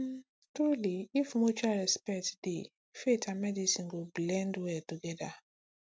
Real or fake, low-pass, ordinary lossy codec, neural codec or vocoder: real; none; none; none